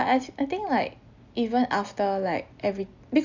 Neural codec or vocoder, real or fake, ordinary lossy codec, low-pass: none; real; none; 7.2 kHz